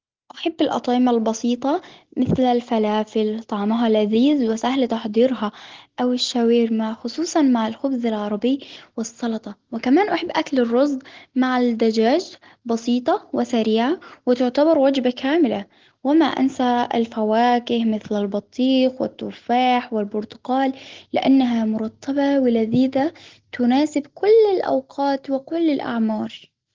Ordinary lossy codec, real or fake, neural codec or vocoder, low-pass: Opus, 16 kbps; real; none; 7.2 kHz